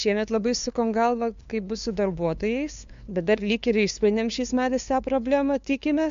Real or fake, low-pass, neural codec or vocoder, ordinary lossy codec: fake; 7.2 kHz; codec, 16 kHz, 2 kbps, FunCodec, trained on LibriTTS, 25 frames a second; MP3, 64 kbps